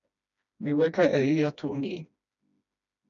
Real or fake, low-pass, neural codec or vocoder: fake; 7.2 kHz; codec, 16 kHz, 1 kbps, FreqCodec, smaller model